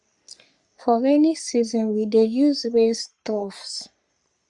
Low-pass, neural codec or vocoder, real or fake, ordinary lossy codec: 10.8 kHz; codec, 44.1 kHz, 3.4 kbps, Pupu-Codec; fake; Opus, 64 kbps